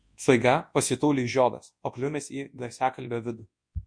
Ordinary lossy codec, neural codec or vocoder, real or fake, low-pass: MP3, 48 kbps; codec, 24 kHz, 0.9 kbps, WavTokenizer, large speech release; fake; 9.9 kHz